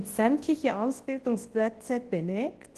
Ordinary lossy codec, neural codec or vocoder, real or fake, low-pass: Opus, 16 kbps; codec, 24 kHz, 0.9 kbps, WavTokenizer, large speech release; fake; 10.8 kHz